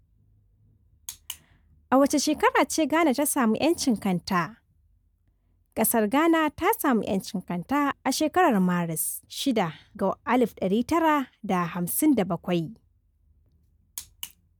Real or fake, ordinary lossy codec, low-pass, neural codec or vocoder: real; none; none; none